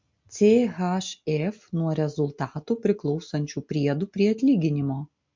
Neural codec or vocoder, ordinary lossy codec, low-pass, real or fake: none; MP3, 48 kbps; 7.2 kHz; real